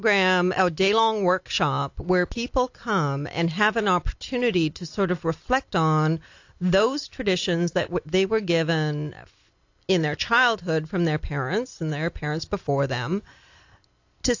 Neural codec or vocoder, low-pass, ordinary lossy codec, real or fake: none; 7.2 kHz; AAC, 48 kbps; real